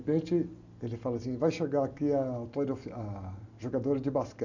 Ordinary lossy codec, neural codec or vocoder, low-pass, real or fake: none; none; 7.2 kHz; real